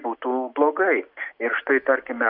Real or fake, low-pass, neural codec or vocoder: real; 5.4 kHz; none